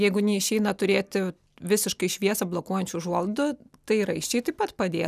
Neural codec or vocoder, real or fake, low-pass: none; real; 14.4 kHz